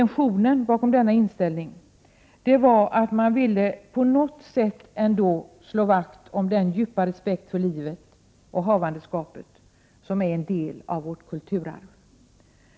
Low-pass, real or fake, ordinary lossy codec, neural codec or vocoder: none; real; none; none